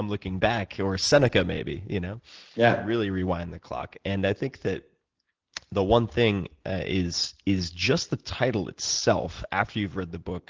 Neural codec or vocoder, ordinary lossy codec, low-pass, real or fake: none; Opus, 16 kbps; 7.2 kHz; real